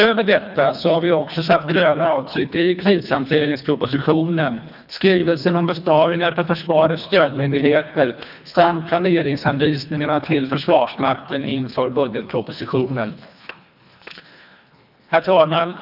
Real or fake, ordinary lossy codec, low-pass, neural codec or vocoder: fake; none; 5.4 kHz; codec, 24 kHz, 1.5 kbps, HILCodec